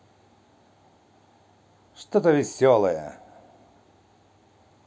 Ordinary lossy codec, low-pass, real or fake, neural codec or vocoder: none; none; real; none